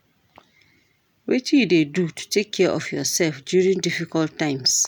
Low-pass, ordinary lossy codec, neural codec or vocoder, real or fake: 19.8 kHz; none; none; real